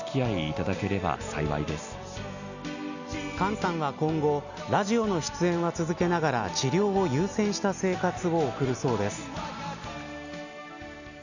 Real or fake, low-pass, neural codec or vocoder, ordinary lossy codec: real; 7.2 kHz; none; none